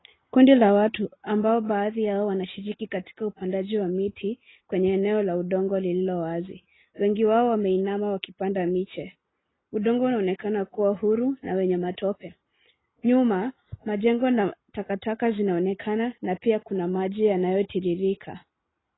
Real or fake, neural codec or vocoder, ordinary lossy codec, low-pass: real; none; AAC, 16 kbps; 7.2 kHz